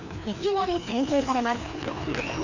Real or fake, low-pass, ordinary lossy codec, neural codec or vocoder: fake; 7.2 kHz; none; codec, 16 kHz, 2 kbps, FreqCodec, larger model